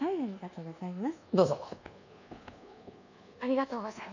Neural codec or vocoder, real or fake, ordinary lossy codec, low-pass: codec, 24 kHz, 1.2 kbps, DualCodec; fake; none; 7.2 kHz